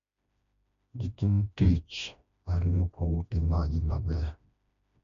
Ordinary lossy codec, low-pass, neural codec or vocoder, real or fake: none; 7.2 kHz; codec, 16 kHz, 1 kbps, FreqCodec, smaller model; fake